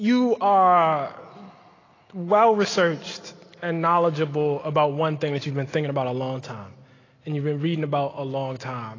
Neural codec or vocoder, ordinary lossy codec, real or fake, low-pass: none; AAC, 32 kbps; real; 7.2 kHz